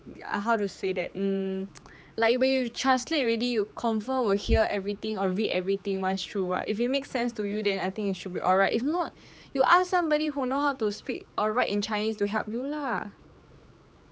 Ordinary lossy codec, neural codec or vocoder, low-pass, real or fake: none; codec, 16 kHz, 4 kbps, X-Codec, HuBERT features, trained on general audio; none; fake